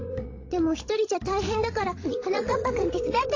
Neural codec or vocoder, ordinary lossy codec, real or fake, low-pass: codec, 16 kHz, 16 kbps, FreqCodec, larger model; AAC, 32 kbps; fake; 7.2 kHz